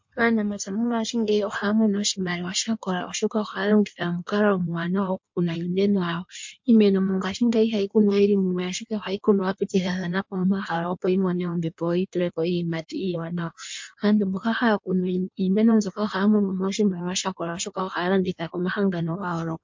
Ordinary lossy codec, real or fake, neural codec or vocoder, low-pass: MP3, 48 kbps; fake; codec, 16 kHz in and 24 kHz out, 1.1 kbps, FireRedTTS-2 codec; 7.2 kHz